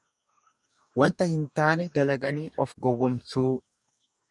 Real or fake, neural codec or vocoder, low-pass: fake; codec, 44.1 kHz, 2.6 kbps, DAC; 10.8 kHz